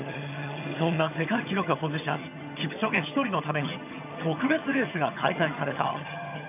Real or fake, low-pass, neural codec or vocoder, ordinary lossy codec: fake; 3.6 kHz; vocoder, 22.05 kHz, 80 mel bands, HiFi-GAN; none